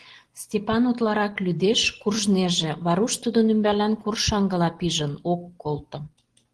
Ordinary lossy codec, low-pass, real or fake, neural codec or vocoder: Opus, 16 kbps; 10.8 kHz; real; none